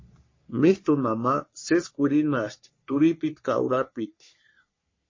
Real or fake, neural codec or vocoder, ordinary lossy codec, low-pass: fake; codec, 44.1 kHz, 3.4 kbps, Pupu-Codec; MP3, 32 kbps; 7.2 kHz